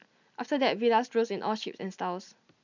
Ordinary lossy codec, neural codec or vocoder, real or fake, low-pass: none; none; real; 7.2 kHz